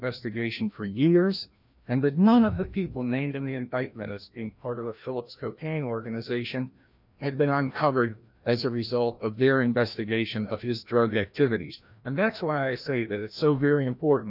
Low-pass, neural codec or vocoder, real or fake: 5.4 kHz; codec, 16 kHz, 1 kbps, FreqCodec, larger model; fake